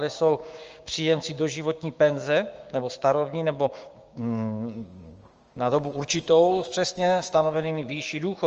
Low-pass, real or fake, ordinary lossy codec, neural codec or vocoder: 7.2 kHz; fake; Opus, 24 kbps; codec, 16 kHz, 6 kbps, DAC